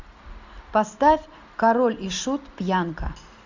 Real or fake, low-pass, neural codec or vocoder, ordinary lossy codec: real; 7.2 kHz; none; Opus, 64 kbps